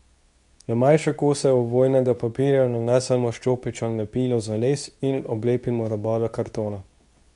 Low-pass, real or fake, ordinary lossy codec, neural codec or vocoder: 10.8 kHz; fake; none; codec, 24 kHz, 0.9 kbps, WavTokenizer, medium speech release version 2